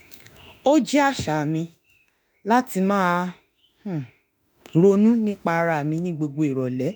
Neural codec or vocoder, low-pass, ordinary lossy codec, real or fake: autoencoder, 48 kHz, 32 numbers a frame, DAC-VAE, trained on Japanese speech; none; none; fake